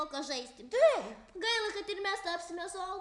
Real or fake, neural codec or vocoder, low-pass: real; none; 10.8 kHz